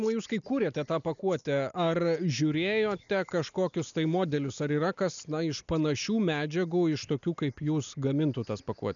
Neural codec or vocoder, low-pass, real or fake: none; 7.2 kHz; real